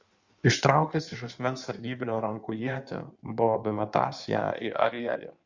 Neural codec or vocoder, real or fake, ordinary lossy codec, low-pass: codec, 16 kHz in and 24 kHz out, 1.1 kbps, FireRedTTS-2 codec; fake; Opus, 64 kbps; 7.2 kHz